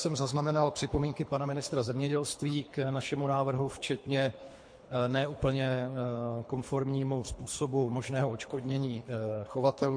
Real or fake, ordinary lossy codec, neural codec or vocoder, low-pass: fake; MP3, 48 kbps; codec, 24 kHz, 3 kbps, HILCodec; 9.9 kHz